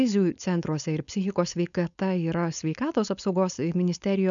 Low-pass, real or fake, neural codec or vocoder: 7.2 kHz; fake; codec, 16 kHz, 4.8 kbps, FACodec